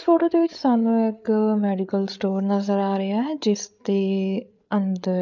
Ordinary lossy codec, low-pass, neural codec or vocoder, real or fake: none; 7.2 kHz; codec, 16 kHz, 8 kbps, FreqCodec, larger model; fake